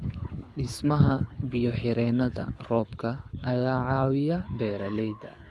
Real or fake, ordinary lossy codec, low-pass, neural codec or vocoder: fake; none; none; codec, 24 kHz, 6 kbps, HILCodec